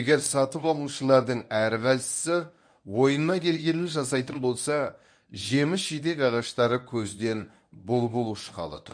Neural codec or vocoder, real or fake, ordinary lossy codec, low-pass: codec, 24 kHz, 0.9 kbps, WavTokenizer, medium speech release version 1; fake; none; 9.9 kHz